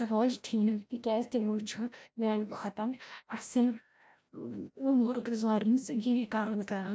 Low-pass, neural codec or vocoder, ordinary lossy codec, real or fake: none; codec, 16 kHz, 0.5 kbps, FreqCodec, larger model; none; fake